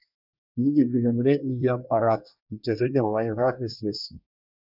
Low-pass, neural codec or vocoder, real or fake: 5.4 kHz; codec, 24 kHz, 1 kbps, SNAC; fake